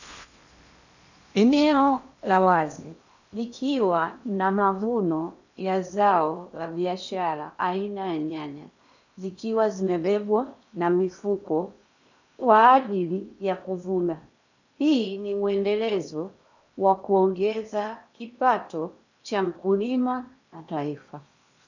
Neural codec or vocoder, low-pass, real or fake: codec, 16 kHz in and 24 kHz out, 0.8 kbps, FocalCodec, streaming, 65536 codes; 7.2 kHz; fake